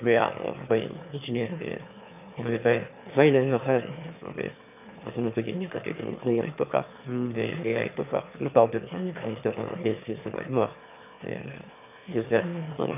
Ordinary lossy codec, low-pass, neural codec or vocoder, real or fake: none; 3.6 kHz; autoencoder, 22.05 kHz, a latent of 192 numbers a frame, VITS, trained on one speaker; fake